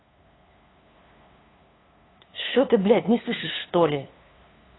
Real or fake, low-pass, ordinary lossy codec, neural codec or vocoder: fake; 7.2 kHz; AAC, 16 kbps; codec, 16 kHz, 2 kbps, FunCodec, trained on LibriTTS, 25 frames a second